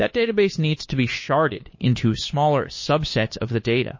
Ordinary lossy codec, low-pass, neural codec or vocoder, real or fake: MP3, 32 kbps; 7.2 kHz; codec, 16 kHz, 2 kbps, FunCodec, trained on Chinese and English, 25 frames a second; fake